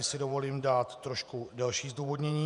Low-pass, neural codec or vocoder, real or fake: 10.8 kHz; vocoder, 48 kHz, 128 mel bands, Vocos; fake